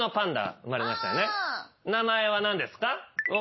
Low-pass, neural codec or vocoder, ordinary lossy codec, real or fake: 7.2 kHz; none; MP3, 24 kbps; real